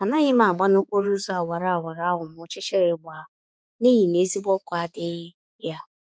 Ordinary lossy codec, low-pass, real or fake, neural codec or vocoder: none; none; fake; codec, 16 kHz, 4 kbps, X-Codec, HuBERT features, trained on balanced general audio